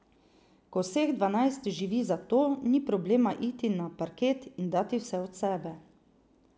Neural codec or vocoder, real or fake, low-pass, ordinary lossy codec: none; real; none; none